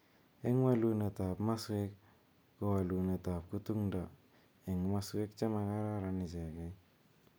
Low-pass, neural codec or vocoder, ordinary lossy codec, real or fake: none; none; none; real